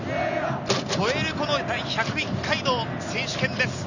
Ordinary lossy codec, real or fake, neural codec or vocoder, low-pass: none; real; none; 7.2 kHz